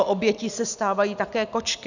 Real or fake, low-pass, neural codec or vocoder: real; 7.2 kHz; none